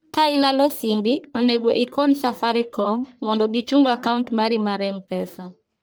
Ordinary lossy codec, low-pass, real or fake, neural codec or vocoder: none; none; fake; codec, 44.1 kHz, 1.7 kbps, Pupu-Codec